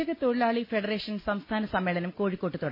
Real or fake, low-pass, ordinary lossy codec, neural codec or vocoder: real; 5.4 kHz; MP3, 24 kbps; none